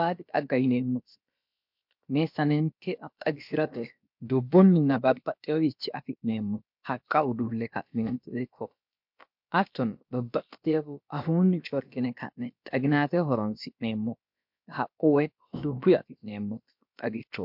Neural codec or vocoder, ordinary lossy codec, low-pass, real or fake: codec, 16 kHz, about 1 kbps, DyCAST, with the encoder's durations; MP3, 48 kbps; 5.4 kHz; fake